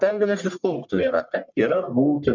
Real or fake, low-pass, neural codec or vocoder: fake; 7.2 kHz; codec, 44.1 kHz, 1.7 kbps, Pupu-Codec